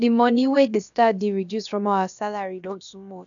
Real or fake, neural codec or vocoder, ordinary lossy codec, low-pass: fake; codec, 16 kHz, about 1 kbps, DyCAST, with the encoder's durations; none; 7.2 kHz